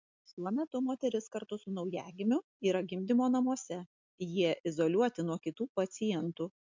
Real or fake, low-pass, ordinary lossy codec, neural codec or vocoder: real; 7.2 kHz; MP3, 64 kbps; none